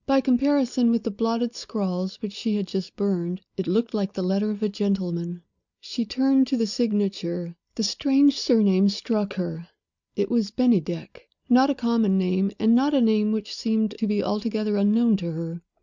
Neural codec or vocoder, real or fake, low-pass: none; real; 7.2 kHz